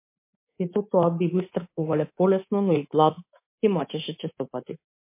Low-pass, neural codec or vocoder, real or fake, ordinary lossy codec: 3.6 kHz; codec, 24 kHz, 3.1 kbps, DualCodec; fake; MP3, 24 kbps